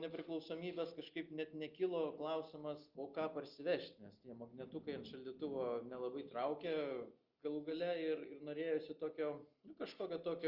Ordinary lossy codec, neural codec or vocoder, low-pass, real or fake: Opus, 24 kbps; none; 5.4 kHz; real